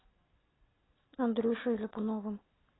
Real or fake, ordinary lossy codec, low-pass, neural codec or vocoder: real; AAC, 16 kbps; 7.2 kHz; none